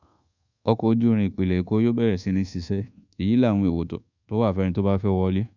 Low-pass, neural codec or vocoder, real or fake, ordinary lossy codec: 7.2 kHz; codec, 24 kHz, 1.2 kbps, DualCodec; fake; none